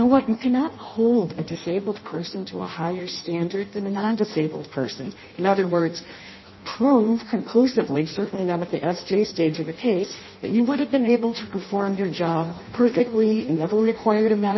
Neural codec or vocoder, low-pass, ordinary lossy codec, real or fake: codec, 16 kHz in and 24 kHz out, 0.6 kbps, FireRedTTS-2 codec; 7.2 kHz; MP3, 24 kbps; fake